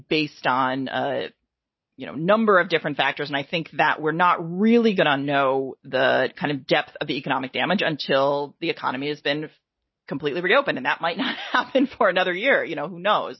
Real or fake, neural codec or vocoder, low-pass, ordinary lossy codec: real; none; 7.2 kHz; MP3, 24 kbps